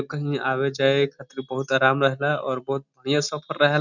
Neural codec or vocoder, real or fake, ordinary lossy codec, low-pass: none; real; none; 7.2 kHz